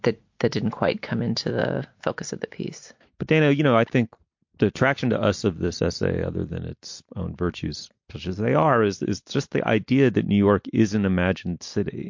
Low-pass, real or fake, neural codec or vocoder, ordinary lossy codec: 7.2 kHz; real; none; MP3, 48 kbps